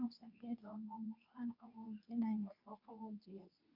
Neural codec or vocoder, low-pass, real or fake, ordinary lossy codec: codec, 24 kHz, 0.9 kbps, WavTokenizer, medium speech release version 2; 5.4 kHz; fake; none